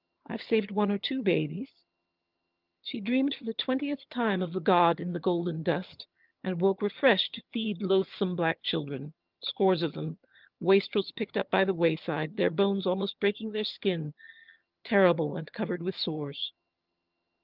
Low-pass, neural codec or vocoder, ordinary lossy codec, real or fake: 5.4 kHz; vocoder, 22.05 kHz, 80 mel bands, HiFi-GAN; Opus, 24 kbps; fake